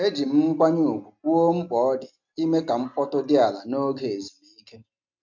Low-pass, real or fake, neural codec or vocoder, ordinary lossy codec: 7.2 kHz; real; none; none